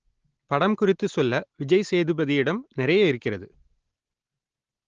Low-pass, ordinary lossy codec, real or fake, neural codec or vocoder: 7.2 kHz; Opus, 16 kbps; real; none